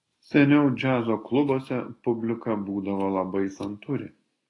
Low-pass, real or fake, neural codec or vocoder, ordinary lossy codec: 10.8 kHz; real; none; AAC, 32 kbps